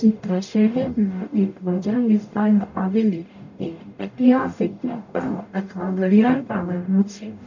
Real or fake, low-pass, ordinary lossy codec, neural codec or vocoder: fake; 7.2 kHz; none; codec, 44.1 kHz, 0.9 kbps, DAC